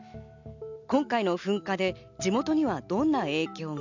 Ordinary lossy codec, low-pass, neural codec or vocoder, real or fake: none; 7.2 kHz; none; real